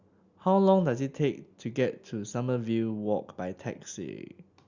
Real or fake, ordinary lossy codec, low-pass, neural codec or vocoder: real; Opus, 64 kbps; 7.2 kHz; none